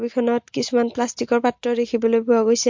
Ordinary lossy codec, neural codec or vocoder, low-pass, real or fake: MP3, 48 kbps; none; 7.2 kHz; real